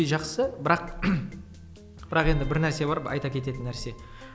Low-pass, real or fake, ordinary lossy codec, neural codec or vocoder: none; real; none; none